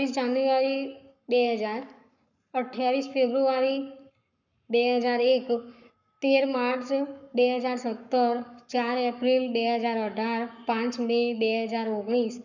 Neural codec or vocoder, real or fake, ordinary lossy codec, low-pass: codec, 44.1 kHz, 7.8 kbps, Pupu-Codec; fake; none; 7.2 kHz